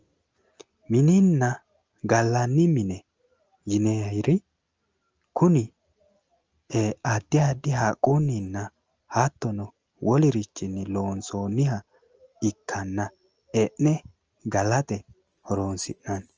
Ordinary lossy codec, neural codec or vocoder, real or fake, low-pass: Opus, 24 kbps; none; real; 7.2 kHz